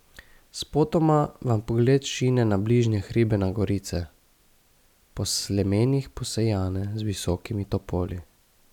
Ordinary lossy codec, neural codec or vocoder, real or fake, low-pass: none; none; real; 19.8 kHz